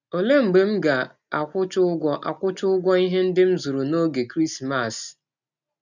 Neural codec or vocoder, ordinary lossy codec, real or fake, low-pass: none; none; real; 7.2 kHz